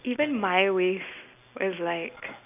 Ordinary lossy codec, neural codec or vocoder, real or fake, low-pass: none; none; real; 3.6 kHz